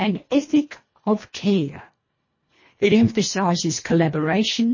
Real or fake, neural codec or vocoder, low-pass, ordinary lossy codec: fake; codec, 24 kHz, 1.5 kbps, HILCodec; 7.2 kHz; MP3, 32 kbps